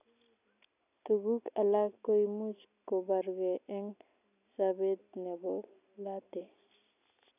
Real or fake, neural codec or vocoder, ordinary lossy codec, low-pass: real; none; none; 3.6 kHz